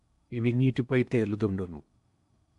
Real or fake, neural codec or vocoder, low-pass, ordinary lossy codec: fake; codec, 16 kHz in and 24 kHz out, 0.8 kbps, FocalCodec, streaming, 65536 codes; 10.8 kHz; AAC, 96 kbps